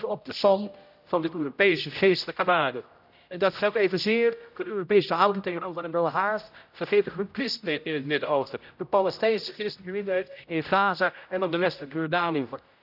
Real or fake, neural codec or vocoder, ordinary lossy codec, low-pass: fake; codec, 16 kHz, 0.5 kbps, X-Codec, HuBERT features, trained on general audio; none; 5.4 kHz